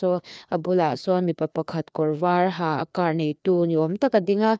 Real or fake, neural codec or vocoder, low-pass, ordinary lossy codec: fake; codec, 16 kHz, 2 kbps, FreqCodec, larger model; none; none